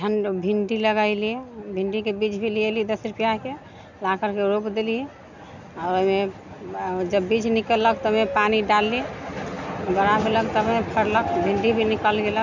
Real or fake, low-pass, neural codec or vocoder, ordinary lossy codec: real; 7.2 kHz; none; none